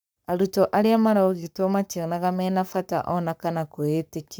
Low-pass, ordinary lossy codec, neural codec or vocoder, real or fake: none; none; codec, 44.1 kHz, 7.8 kbps, Pupu-Codec; fake